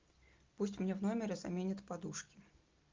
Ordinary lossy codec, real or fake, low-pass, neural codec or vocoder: Opus, 24 kbps; real; 7.2 kHz; none